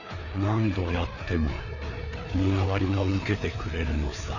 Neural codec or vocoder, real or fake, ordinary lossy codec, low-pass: codec, 16 kHz, 4 kbps, FreqCodec, larger model; fake; none; 7.2 kHz